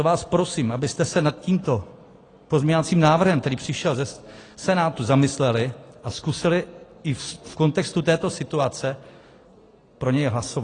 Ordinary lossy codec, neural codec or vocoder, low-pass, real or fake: AAC, 32 kbps; none; 9.9 kHz; real